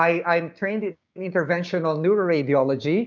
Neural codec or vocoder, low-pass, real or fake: codec, 16 kHz, 6 kbps, DAC; 7.2 kHz; fake